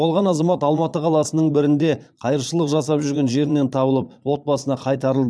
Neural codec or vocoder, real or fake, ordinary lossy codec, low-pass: vocoder, 22.05 kHz, 80 mel bands, Vocos; fake; none; none